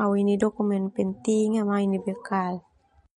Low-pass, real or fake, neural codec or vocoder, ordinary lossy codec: 19.8 kHz; real; none; MP3, 48 kbps